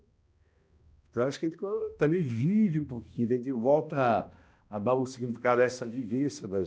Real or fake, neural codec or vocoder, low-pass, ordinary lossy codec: fake; codec, 16 kHz, 1 kbps, X-Codec, HuBERT features, trained on balanced general audio; none; none